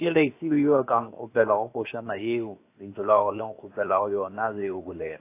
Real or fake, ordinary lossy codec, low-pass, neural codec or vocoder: fake; AAC, 24 kbps; 3.6 kHz; codec, 16 kHz, 0.7 kbps, FocalCodec